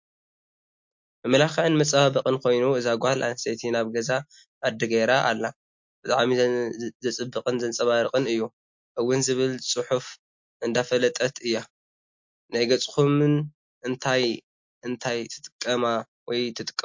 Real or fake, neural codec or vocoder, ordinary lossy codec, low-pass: real; none; MP3, 48 kbps; 7.2 kHz